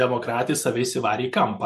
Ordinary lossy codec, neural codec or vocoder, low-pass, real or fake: MP3, 64 kbps; none; 14.4 kHz; real